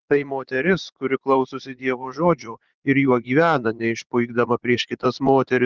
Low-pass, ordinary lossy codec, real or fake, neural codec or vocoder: 7.2 kHz; Opus, 16 kbps; fake; vocoder, 44.1 kHz, 80 mel bands, Vocos